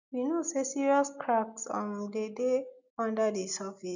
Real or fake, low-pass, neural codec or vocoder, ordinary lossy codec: real; 7.2 kHz; none; none